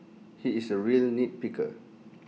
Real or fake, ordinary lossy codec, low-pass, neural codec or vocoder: real; none; none; none